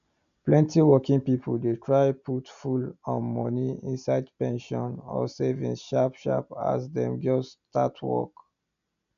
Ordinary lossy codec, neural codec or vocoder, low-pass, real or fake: none; none; 7.2 kHz; real